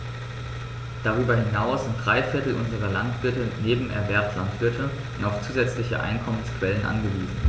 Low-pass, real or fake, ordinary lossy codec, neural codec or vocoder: none; real; none; none